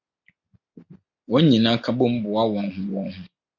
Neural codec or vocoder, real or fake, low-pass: none; real; 7.2 kHz